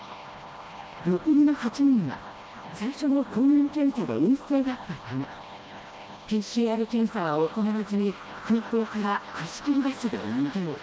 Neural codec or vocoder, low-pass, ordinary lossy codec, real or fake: codec, 16 kHz, 1 kbps, FreqCodec, smaller model; none; none; fake